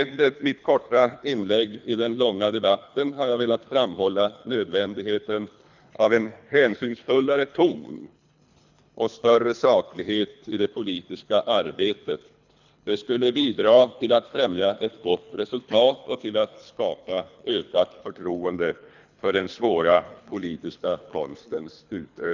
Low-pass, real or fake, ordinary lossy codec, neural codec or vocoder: 7.2 kHz; fake; none; codec, 24 kHz, 3 kbps, HILCodec